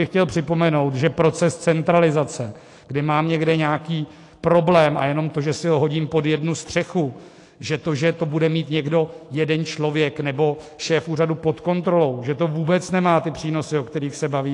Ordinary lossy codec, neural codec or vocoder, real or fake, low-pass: AAC, 48 kbps; autoencoder, 48 kHz, 128 numbers a frame, DAC-VAE, trained on Japanese speech; fake; 10.8 kHz